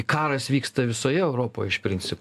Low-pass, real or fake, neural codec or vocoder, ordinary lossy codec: 14.4 kHz; real; none; AAC, 96 kbps